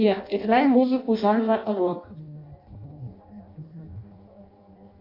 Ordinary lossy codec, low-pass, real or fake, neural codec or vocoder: MP3, 48 kbps; 5.4 kHz; fake; codec, 16 kHz in and 24 kHz out, 0.6 kbps, FireRedTTS-2 codec